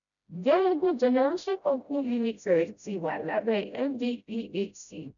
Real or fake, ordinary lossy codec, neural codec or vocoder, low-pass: fake; none; codec, 16 kHz, 0.5 kbps, FreqCodec, smaller model; 7.2 kHz